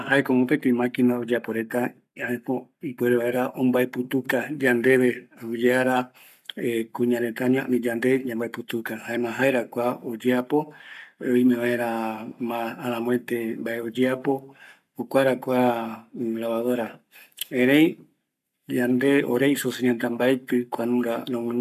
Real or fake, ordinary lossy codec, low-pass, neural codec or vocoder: fake; none; 14.4 kHz; codec, 44.1 kHz, 7.8 kbps, Pupu-Codec